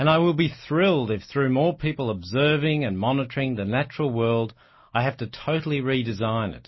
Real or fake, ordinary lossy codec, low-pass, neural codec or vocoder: real; MP3, 24 kbps; 7.2 kHz; none